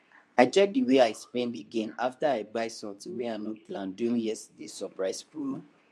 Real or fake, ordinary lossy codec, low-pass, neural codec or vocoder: fake; none; none; codec, 24 kHz, 0.9 kbps, WavTokenizer, medium speech release version 2